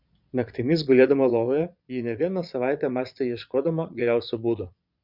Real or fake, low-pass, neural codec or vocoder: fake; 5.4 kHz; vocoder, 22.05 kHz, 80 mel bands, Vocos